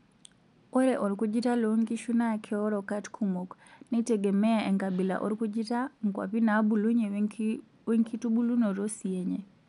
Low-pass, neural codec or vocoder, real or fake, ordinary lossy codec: 10.8 kHz; none; real; none